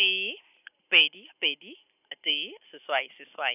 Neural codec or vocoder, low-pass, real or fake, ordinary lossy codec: none; 3.6 kHz; real; none